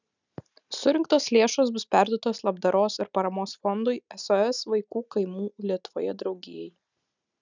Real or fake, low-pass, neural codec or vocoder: real; 7.2 kHz; none